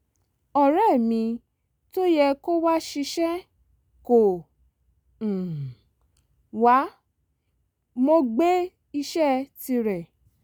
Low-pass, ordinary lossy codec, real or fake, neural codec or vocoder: none; none; real; none